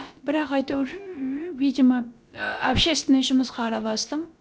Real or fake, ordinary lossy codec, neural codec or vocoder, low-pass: fake; none; codec, 16 kHz, about 1 kbps, DyCAST, with the encoder's durations; none